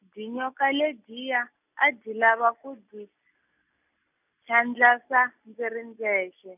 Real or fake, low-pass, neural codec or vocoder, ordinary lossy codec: real; 3.6 kHz; none; none